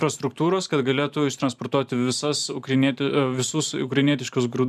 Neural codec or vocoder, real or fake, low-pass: vocoder, 44.1 kHz, 128 mel bands every 512 samples, BigVGAN v2; fake; 14.4 kHz